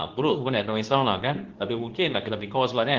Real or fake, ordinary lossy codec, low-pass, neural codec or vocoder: fake; Opus, 16 kbps; 7.2 kHz; codec, 24 kHz, 0.9 kbps, WavTokenizer, medium speech release version 2